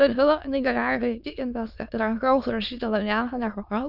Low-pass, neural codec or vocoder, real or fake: 5.4 kHz; autoencoder, 22.05 kHz, a latent of 192 numbers a frame, VITS, trained on many speakers; fake